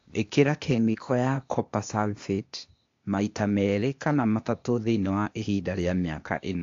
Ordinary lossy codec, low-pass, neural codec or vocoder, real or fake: AAC, 48 kbps; 7.2 kHz; codec, 16 kHz, 0.8 kbps, ZipCodec; fake